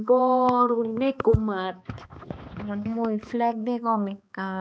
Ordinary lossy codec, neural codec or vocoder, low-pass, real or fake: none; codec, 16 kHz, 2 kbps, X-Codec, HuBERT features, trained on balanced general audio; none; fake